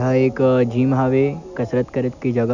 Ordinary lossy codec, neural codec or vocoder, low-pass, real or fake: none; none; 7.2 kHz; real